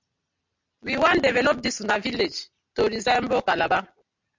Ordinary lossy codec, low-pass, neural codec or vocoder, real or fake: MP3, 64 kbps; 7.2 kHz; none; real